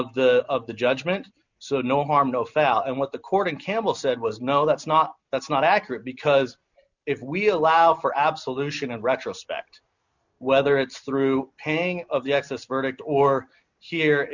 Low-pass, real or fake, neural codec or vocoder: 7.2 kHz; real; none